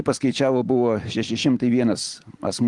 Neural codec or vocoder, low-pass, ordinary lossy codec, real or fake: none; 10.8 kHz; Opus, 24 kbps; real